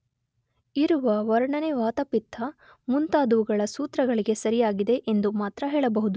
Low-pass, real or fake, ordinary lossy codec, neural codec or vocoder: none; real; none; none